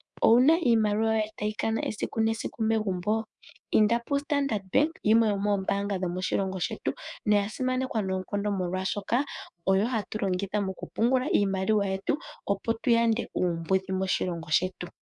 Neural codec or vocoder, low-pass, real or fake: codec, 24 kHz, 3.1 kbps, DualCodec; 10.8 kHz; fake